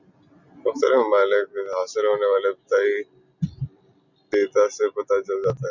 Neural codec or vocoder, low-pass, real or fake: none; 7.2 kHz; real